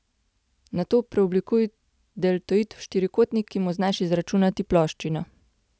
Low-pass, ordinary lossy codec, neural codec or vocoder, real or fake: none; none; none; real